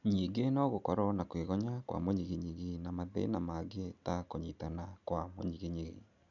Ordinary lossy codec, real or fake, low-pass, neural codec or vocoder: none; real; 7.2 kHz; none